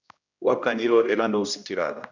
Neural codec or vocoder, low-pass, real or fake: codec, 16 kHz, 1 kbps, X-Codec, HuBERT features, trained on general audio; 7.2 kHz; fake